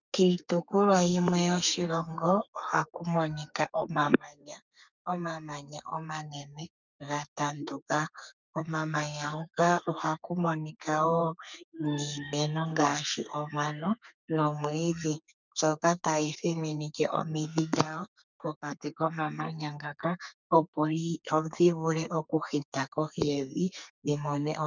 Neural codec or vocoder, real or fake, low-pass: codec, 32 kHz, 1.9 kbps, SNAC; fake; 7.2 kHz